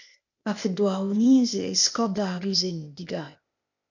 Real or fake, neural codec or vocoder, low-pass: fake; codec, 16 kHz, 0.8 kbps, ZipCodec; 7.2 kHz